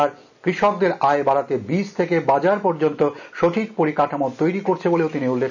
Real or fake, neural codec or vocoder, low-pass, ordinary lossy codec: real; none; 7.2 kHz; none